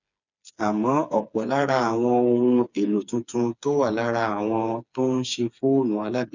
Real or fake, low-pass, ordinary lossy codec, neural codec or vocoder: fake; 7.2 kHz; none; codec, 16 kHz, 4 kbps, FreqCodec, smaller model